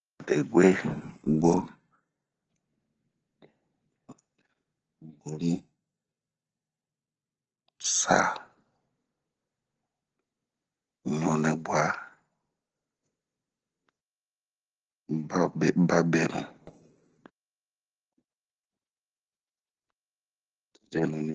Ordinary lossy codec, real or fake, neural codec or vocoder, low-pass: Opus, 16 kbps; real; none; 7.2 kHz